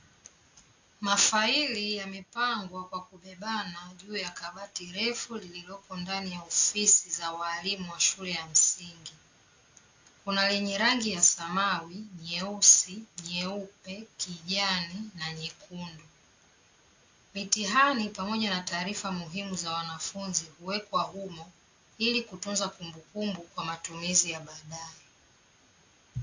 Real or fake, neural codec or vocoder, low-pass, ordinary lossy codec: real; none; 7.2 kHz; AAC, 48 kbps